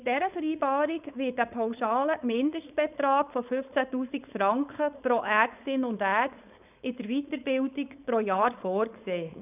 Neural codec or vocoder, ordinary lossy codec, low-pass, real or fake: codec, 16 kHz, 4.8 kbps, FACodec; none; 3.6 kHz; fake